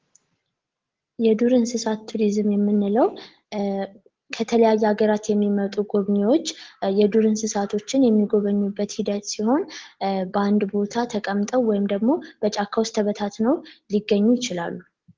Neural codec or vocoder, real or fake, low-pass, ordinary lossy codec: none; real; 7.2 kHz; Opus, 16 kbps